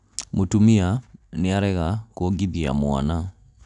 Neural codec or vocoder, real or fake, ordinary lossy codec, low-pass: none; real; none; 10.8 kHz